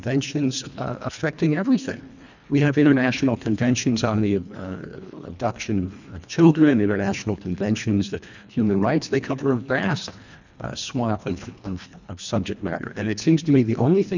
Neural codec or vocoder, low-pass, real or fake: codec, 24 kHz, 1.5 kbps, HILCodec; 7.2 kHz; fake